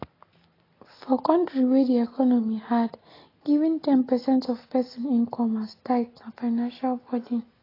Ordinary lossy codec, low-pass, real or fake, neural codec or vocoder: AAC, 24 kbps; 5.4 kHz; real; none